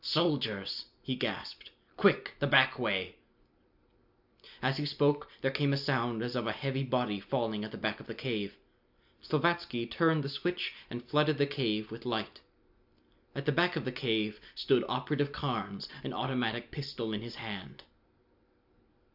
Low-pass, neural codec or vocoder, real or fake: 5.4 kHz; none; real